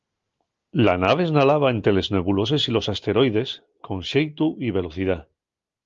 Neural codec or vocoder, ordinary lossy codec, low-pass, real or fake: none; Opus, 32 kbps; 7.2 kHz; real